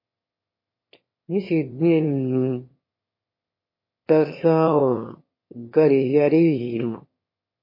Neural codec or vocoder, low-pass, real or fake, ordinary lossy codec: autoencoder, 22.05 kHz, a latent of 192 numbers a frame, VITS, trained on one speaker; 5.4 kHz; fake; MP3, 24 kbps